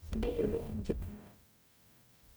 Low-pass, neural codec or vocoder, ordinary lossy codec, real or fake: none; codec, 44.1 kHz, 0.9 kbps, DAC; none; fake